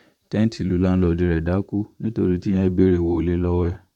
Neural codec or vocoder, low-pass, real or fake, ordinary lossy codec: vocoder, 44.1 kHz, 128 mel bands, Pupu-Vocoder; 19.8 kHz; fake; none